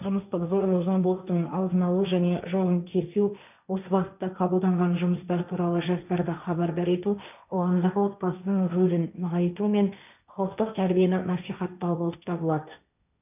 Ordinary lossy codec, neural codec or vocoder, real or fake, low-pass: none; codec, 16 kHz, 1.1 kbps, Voila-Tokenizer; fake; 3.6 kHz